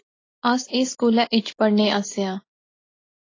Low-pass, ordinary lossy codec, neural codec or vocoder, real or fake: 7.2 kHz; AAC, 32 kbps; none; real